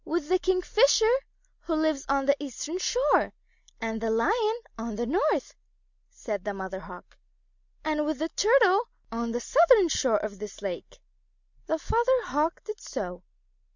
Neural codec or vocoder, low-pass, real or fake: none; 7.2 kHz; real